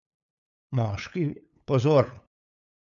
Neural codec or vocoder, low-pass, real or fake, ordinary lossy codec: codec, 16 kHz, 8 kbps, FunCodec, trained on LibriTTS, 25 frames a second; 7.2 kHz; fake; none